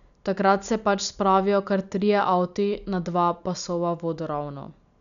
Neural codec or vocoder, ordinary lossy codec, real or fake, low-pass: none; none; real; 7.2 kHz